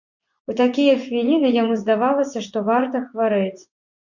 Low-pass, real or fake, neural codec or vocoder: 7.2 kHz; fake; vocoder, 24 kHz, 100 mel bands, Vocos